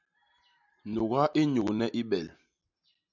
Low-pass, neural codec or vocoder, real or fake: 7.2 kHz; none; real